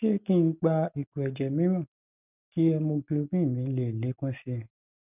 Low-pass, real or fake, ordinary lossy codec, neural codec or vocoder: 3.6 kHz; real; none; none